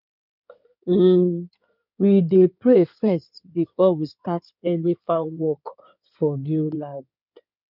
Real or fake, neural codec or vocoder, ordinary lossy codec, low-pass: fake; codec, 16 kHz in and 24 kHz out, 2.2 kbps, FireRedTTS-2 codec; none; 5.4 kHz